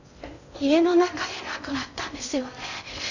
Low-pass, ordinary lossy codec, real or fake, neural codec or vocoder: 7.2 kHz; none; fake; codec, 16 kHz in and 24 kHz out, 0.6 kbps, FocalCodec, streaming, 2048 codes